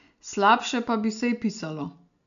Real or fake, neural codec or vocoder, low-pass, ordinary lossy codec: real; none; 7.2 kHz; none